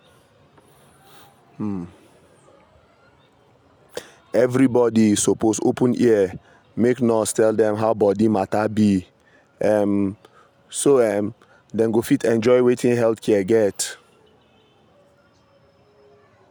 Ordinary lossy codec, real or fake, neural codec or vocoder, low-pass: none; real; none; none